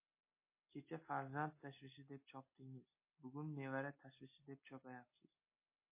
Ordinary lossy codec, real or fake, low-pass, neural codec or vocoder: MP3, 24 kbps; real; 3.6 kHz; none